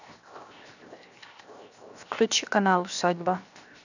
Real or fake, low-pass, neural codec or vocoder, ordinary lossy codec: fake; 7.2 kHz; codec, 16 kHz, 0.7 kbps, FocalCodec; none